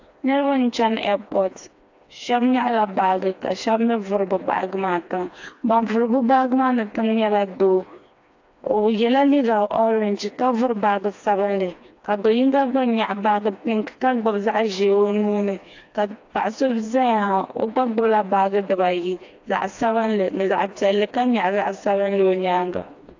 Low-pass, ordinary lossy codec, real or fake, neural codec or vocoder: 7.2 kHz; AAC, 48 kbps; fake; codec, 16 kHz, 2 kbps, FreqCodec, smaller model